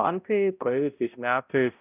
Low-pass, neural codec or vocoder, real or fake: 3.6 kHz; codec, 16 kHz, 0.5 kbps, X-Codec, HuBERT features, trained on balanced general audio; fake